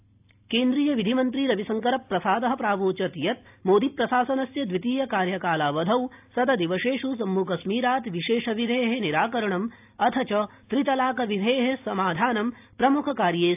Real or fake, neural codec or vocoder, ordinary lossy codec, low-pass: real; none; AAC, 32 kbps; 3.6 kHz